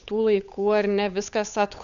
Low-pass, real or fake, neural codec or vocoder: 7.2 kHz; fake; codec, 16 kHz, 8 kbps, FunCodec, trained on Chinese and English, 25 frames a second